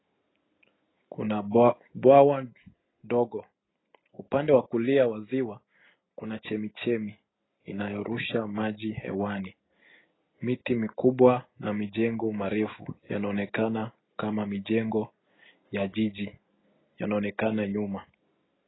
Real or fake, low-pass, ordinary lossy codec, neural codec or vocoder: real; 7.2 kHz; AAC, 16 kbps; none